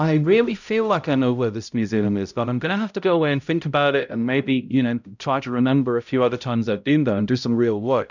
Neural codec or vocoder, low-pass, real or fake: codec, 16 kHz, 0.5 kbps, X-Codec, HuBERT features, trained on balanced general audio; 7.2 kHz; fake